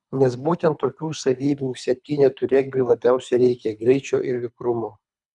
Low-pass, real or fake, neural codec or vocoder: 10.8 kHz; fake; codec, 24 kHz, 3 kbps, HILCodec